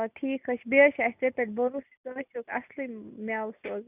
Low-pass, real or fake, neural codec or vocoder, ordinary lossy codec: 3.6 kHz; real; none; none